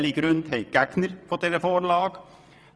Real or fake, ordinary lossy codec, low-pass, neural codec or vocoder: fake; none; none; vocoder, 22.05 kHz, 80 mel bands, WaveNeXt